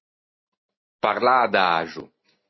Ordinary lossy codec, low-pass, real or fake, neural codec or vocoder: MP3, 24 kbps; 7.2 kHz; real; none